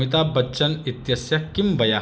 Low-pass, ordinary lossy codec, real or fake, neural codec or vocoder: none; none; real; none